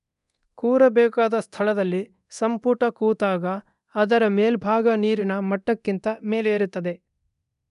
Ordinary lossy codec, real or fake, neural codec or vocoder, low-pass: none; fake; codec, 24 kHz, 0.9 kbps, DualCodec; 10.8 kHz